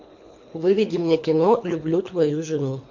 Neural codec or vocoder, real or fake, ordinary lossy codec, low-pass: codec, 24 kHz, 3 kbps, HILCodec; fake; MP3, 48 kbps; 7.2 kHz